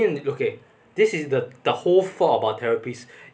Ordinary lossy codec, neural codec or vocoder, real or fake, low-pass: none; none; real; none